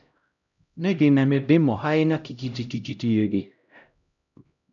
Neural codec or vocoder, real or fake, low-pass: codec, 16 kHz, 0.5 kbps, X-Codec, HuBERT features, trained on LibriSpeech; fake; 7.2 kHz